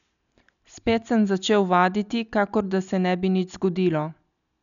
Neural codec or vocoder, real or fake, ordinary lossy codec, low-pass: none; real; MP3, 96 kbps; 7.2 kHz